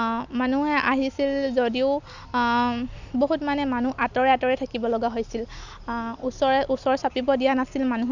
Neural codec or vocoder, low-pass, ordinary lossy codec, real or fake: none; 7.2 kHz; none; real